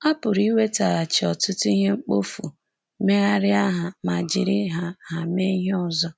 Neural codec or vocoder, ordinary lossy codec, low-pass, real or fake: none; none; none; real